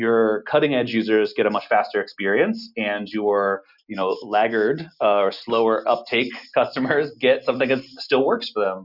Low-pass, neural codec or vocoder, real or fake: 5.4 kHz; none; real